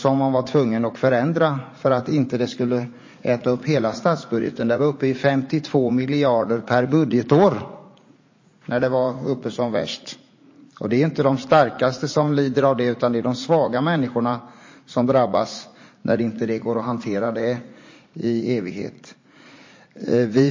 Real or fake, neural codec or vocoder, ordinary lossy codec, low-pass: fake; vocoder, 44.1 kHz, 80 mel bands, Vocos; MP3, 32 kbps; 7.2 kHz